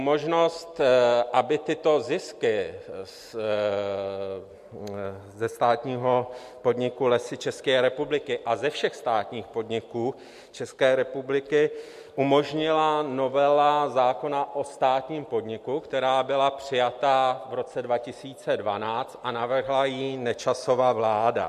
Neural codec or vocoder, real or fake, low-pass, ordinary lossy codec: none; real; 14.4 kHz; MP3, 64 kbps